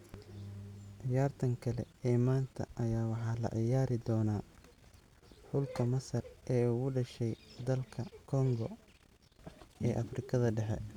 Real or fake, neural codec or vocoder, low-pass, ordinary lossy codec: real; none; 19.8 kHz; none